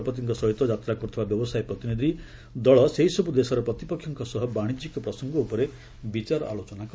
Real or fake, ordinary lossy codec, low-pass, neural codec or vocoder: real; none; none; none